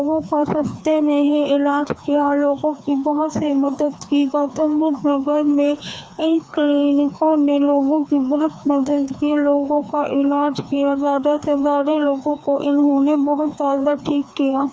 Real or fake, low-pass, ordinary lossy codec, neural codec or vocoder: fake; none; none; codec, 16 kHz, 2 kbps, FreqCodec, larger model